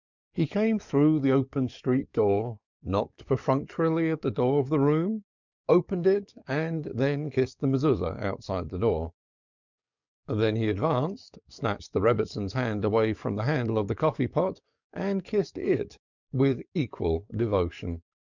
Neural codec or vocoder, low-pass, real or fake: codec, 44.1 kHz, 7.8 kbps, DAC; 7.2 kHz; fake